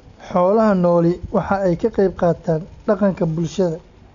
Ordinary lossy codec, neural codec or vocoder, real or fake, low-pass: none; none; real; 7.2 kHz